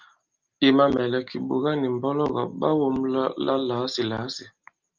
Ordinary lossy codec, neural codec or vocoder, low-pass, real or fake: Opus, 24 kbps; none; 7.2 kHz; real